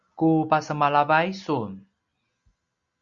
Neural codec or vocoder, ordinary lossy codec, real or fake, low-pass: none; Opus, 64 kbps; real; 7.2 kHz